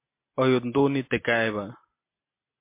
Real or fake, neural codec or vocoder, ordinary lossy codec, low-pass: real; none; MP3, 24 kbps; 3.6 kHz